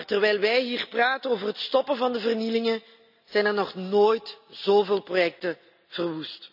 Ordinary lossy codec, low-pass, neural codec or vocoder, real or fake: none; 5.4 kHz; none; real